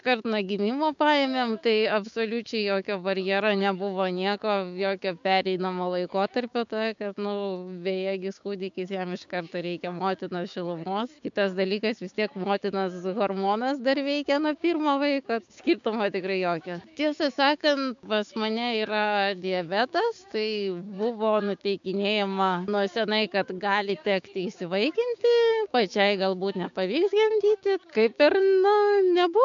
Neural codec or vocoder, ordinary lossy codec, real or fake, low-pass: codec, 16 kHz, 6 kbps, DAC; MP3, 64 kbps; fake; 7.2 kHz